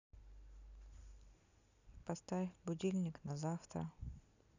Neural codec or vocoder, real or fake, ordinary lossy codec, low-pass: none; real; none; 7.2 kHz